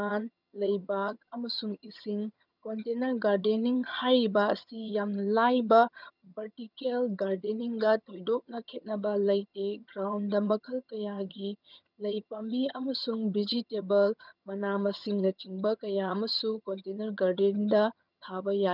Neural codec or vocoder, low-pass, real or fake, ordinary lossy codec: vocoder, 22.05 kHz, 80 mel bands, HiFi-GAN; 5.4 kHz; fake; none